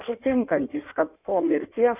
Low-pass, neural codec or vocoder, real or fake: 3.6 kHz; codec, 16 kHz in and 24 kHz out, 1.1 kbps, FireRedTTS-2 codec; fake